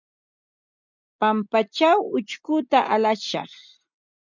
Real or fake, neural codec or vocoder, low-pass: real; none; 7.2 kHz